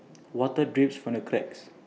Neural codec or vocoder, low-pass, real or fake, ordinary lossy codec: none; none; real; none